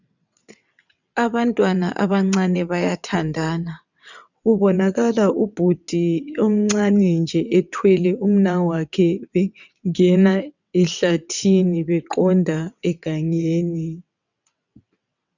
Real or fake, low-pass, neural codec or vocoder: fake; 7.2 kHz; vocoder, 22.05 kHz, 80 mel bands, WaveNeXt